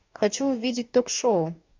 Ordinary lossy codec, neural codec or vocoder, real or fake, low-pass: MP3, 64 kbps; codec, 44.1 kHz, 2.6 kbps, DAC; fake; 7.2 kHz